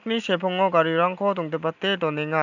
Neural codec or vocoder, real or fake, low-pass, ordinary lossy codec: none; real; 7.2 kHz; none